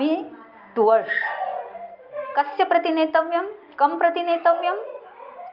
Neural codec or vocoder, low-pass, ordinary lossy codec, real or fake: none; 5.4 kHz; Opus, 24 kbps; real